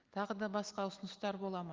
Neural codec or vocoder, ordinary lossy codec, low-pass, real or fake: none; Opus, 32 kbps; 7.2 kHz; real